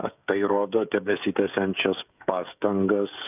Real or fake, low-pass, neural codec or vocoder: fake; 3.6 kHz; codec, 16 kHz, 16 kbps, FreqCodec, smaller model